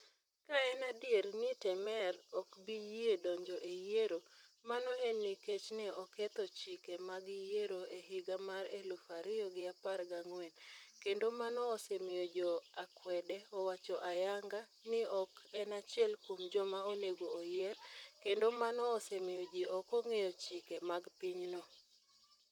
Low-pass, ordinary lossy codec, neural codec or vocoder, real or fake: 19.8 kHz; none; vocoder, 44.1 kHz, 128 mel bands, Pupu-Vocoder; fake